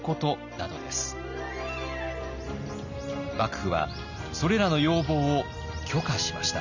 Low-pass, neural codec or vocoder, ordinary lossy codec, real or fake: 7.2 kHz; none; none; real